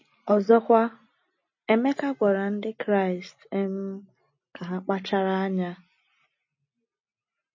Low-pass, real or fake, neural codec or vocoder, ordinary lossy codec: 7.2 kHz; real; none; MP3, 32 kbps